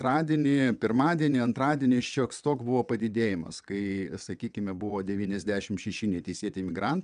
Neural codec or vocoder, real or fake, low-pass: vocoder, 22.05 kHz, 80 mel bands, WaveNeXt; fake; 9.9 kHz